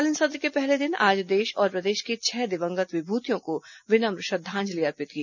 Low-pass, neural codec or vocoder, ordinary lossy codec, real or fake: 7.2 kHz; none; none; real